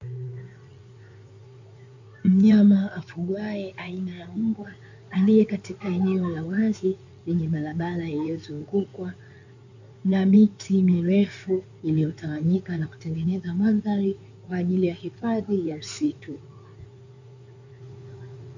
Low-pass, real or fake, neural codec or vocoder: 7.2 kHz; fake; codec, 16 kHz in and 24 kHz out, 2.2 kbps, FireRedTTS-2 codec